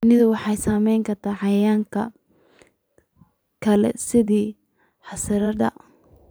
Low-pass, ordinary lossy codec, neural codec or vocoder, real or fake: none; none; vocoder, 44.1 kHz, 128 mel bands every 512 samples, BigVGAN v2; fake